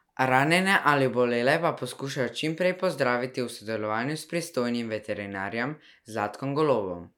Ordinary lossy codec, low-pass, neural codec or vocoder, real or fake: none; 19.8 kHz; none; real